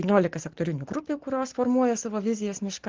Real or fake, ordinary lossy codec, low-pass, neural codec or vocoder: real; Opus, 16 kbps; 7.2 kHz; none